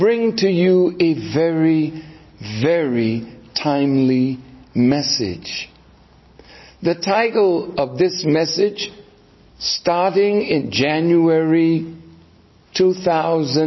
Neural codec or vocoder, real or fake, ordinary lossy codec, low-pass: none; real; MP3, 24 kbps; 7.2 kHz